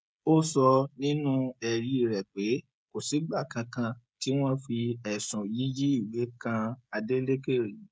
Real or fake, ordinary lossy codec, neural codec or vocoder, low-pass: fake; none; codec, 16 kHz, 16 kbps, FreqCodec, smaller model; none